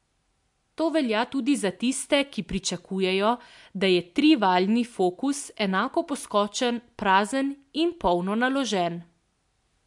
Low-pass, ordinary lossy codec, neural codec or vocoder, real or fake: 10.8 kHz; MP3, 64 kbps; none; real